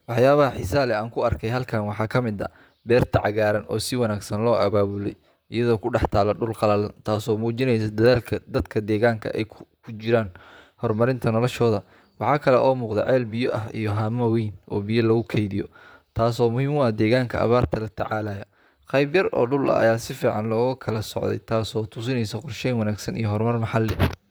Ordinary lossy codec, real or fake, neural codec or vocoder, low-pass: none; fake; vocoder, 44.1 kHz, 128 mel bands, Pupu-Vocoder; none